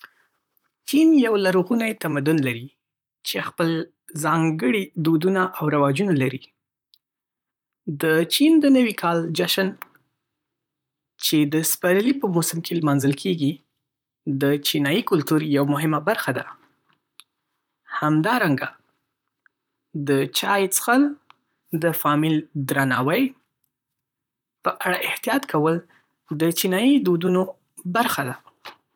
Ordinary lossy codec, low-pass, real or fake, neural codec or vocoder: none; 19.8 kHz; fake; vocoder, 44.1 kHz, 128 mel bands, Pupu-Vocoder